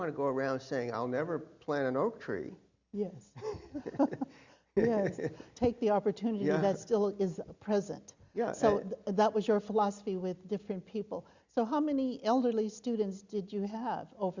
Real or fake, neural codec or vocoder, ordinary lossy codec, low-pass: real; none; Opus, 64 kbps; 7.2 kHz